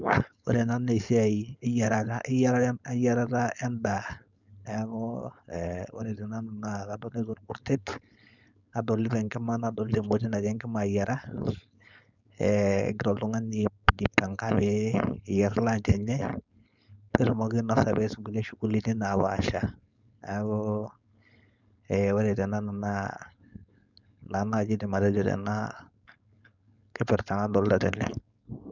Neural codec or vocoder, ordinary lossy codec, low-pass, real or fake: codec, 16 kHz, 4.8 kbps, FACodec; none; 7.2 kHz; fake